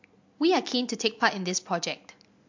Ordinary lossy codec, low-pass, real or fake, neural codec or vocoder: MP3, 48 kbps; 7.2 kHz; real; none